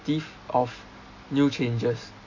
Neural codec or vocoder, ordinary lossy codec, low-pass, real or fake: none; AAC, 48 kbps; 7.2 kHz; real